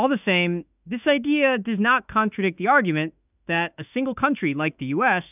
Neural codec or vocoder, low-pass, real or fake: autoencoder, 48 kHz, 32 numbers a frame, DAC-VAE, trained on Japanese speech; 3.6 kHz; fake